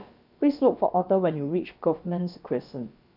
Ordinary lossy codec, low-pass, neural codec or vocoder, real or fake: none; 5.4 kHz; codec, 16 kHz, about 1 kbps, DyCAST, with the encoder's durations; fake